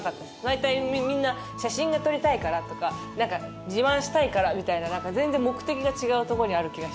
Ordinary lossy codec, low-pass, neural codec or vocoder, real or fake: none; none; none; real